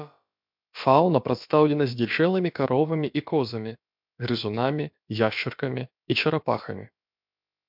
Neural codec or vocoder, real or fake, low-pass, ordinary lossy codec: codec, 16 kHz, about 1 kbps, DyCAST, with the encoder's durations; fake; 5.4 kHz; MP3, 48 kbps